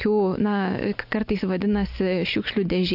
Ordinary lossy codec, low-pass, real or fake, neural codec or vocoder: AAC, 48 kbps; 5.4 kHz; real; none